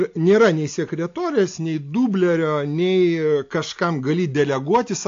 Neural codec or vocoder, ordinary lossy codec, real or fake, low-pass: none; AAC, 48 kbps; real; 7.2 kHz